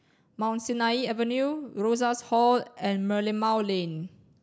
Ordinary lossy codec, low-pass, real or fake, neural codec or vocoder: none; none; real; none